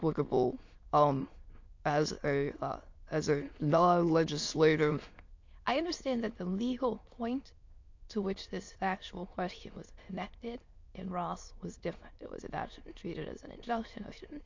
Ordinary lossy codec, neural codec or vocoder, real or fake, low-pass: MP3, 48 kbps; autoencoder, 22.05 kHz, a latent of 192 numbers a frame, VITS, trained on many speakers; fake; 7.2 kHz